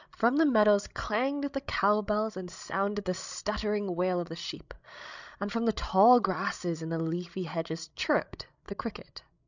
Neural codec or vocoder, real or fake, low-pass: codec, 16 kHz, 16 kbps, FreqCodec, larger model; fake; 7.2 kHz